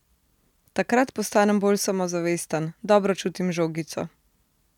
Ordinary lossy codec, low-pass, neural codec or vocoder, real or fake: none; 19.8 kHz; none; real